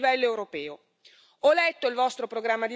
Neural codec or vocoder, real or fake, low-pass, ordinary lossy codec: none; real; none; none